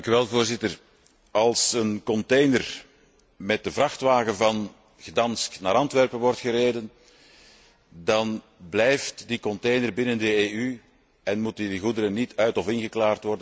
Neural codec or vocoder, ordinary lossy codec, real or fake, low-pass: none; none; real; none